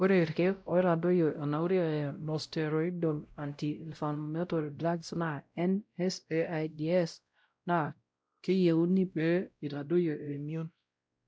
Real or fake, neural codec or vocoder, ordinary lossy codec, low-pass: fake; codec, 16 kHz, 0.5 kbps, X-Codec, WavLM features, trained on Multilingual LibriSpeech; none; none